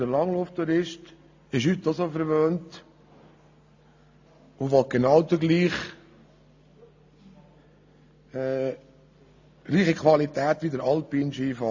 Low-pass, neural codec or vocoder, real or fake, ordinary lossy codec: 7.2 kHz; none; real; AAC, 48 kbps